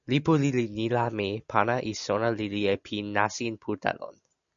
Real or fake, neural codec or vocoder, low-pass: real; none; 7.2 kHz